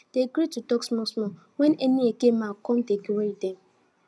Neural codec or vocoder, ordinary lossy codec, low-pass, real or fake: none; none; none; real